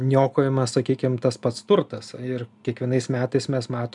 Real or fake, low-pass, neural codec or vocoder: real; 10.8 kHz; none